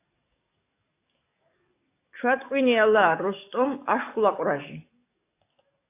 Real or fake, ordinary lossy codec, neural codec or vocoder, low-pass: fake; AAC, 24 kbps; codec, 44.1 kHz, 7.8 kbps, DAC; 3.6 kHz